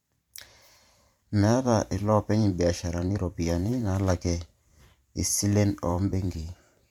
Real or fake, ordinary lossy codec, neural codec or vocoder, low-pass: fake; MP3, 96 kbps; vocoder, 44.1 kHz, 128 mel bands every 512 samples, BigVGAN v2; 19.8 kHz